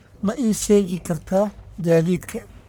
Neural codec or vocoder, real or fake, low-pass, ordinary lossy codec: codec, 44.1 kHz, 1.7 kbps, Pupu-Codec; fake; none; none